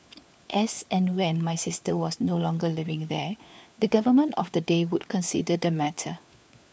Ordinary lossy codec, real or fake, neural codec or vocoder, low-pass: none; fake; codec, 16 kHz, 4 kbps, FunCodec, trained on LibriTTS, 50 frames a second; none